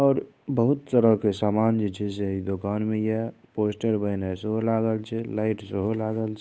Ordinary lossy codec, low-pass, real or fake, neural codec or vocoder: none; none; fake; codec, 16 kHz, 8 kbps, FunCodec, trained on Chinese and English, 25 frames a second